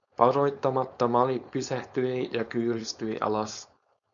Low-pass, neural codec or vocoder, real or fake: 7.2 kHz; codec, 16 kHz, 4.8 kbps, FACodec; fake